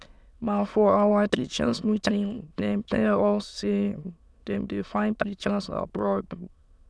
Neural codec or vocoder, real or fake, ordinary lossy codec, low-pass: autoencoder, 22.05 kHz, a latent of 192 numbers a frame, VITS, trained on many speakers; fake; none; none